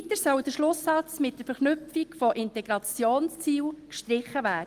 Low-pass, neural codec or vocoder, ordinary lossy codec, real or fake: 14.4 kHz; none; Opus, 24 kbps; real